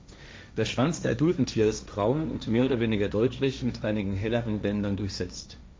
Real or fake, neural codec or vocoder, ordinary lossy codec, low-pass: fake; codec, 16 kHz, 1.1 kbps, Voila-Tokenizer; none; none